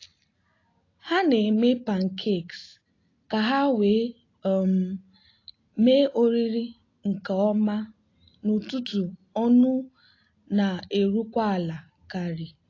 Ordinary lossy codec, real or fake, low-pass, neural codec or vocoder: AAC, 32 kbps; real; 7.2 kHz; none